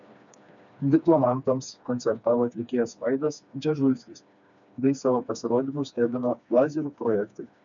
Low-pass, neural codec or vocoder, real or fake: 7.2 kHz; codec, 16 kHz, 2 kbps, FreqCodec, smaller model; fake